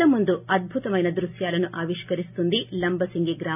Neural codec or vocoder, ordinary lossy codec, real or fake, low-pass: none; none; real; 3.6 kHz